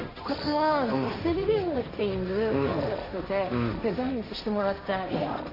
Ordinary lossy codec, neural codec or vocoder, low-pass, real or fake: AAC, 32 kbps; codec, 16 kHz, 1.1 kbps, Voila-Tokenizer; 5.4 kHz; fake